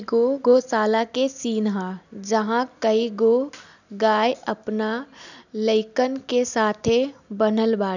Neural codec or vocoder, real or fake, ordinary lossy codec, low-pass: none; real; none; 7.2 kHz